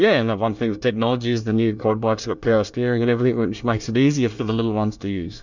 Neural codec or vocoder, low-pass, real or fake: codec, 24 kHz, 1 kbps, SNAC; 7.2 kHz; fake